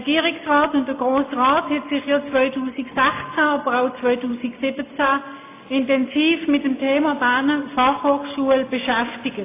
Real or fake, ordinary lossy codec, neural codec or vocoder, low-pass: real; AAC, 24 kbps; none; 3.6 kHz